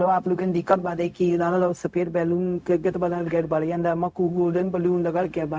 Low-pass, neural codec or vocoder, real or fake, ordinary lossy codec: none; codec, 16 kHz, 0.4 kbps, LongCat-Audio-Codec; fake; none